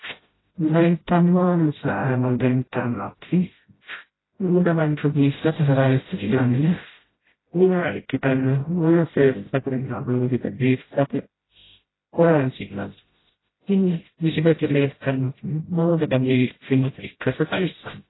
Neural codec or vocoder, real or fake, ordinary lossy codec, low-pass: codec, 16 kHz, 0.5 kbps, FreqCodec, smaller model; fake; AAC, 16 kbps; 7.2 kHz